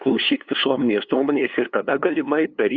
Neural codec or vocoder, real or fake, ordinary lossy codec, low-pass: codec, 16 kHz, 2 kbps, FunCodec, trained on LibriTTS, 25 frames a second; fake; Opus, 64 kbps; 7.2 kHz